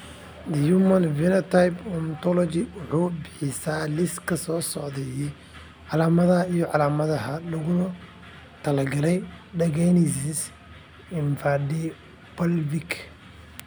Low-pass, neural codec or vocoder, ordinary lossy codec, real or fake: none; none; none; real